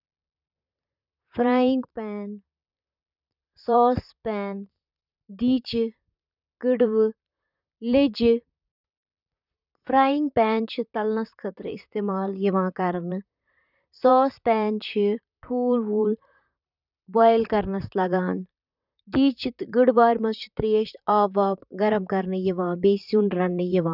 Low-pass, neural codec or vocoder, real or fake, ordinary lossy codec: 5.4 kHz; vocoder, 44.1 kHz, 128 mel bands, Pupu-Vocoder; fake; none